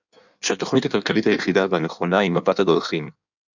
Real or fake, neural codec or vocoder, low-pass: fake; codec, 16 kHz in and 24 kHz out, 1.1 kbps, FireRedTTS-2 codec; 7.2 kHz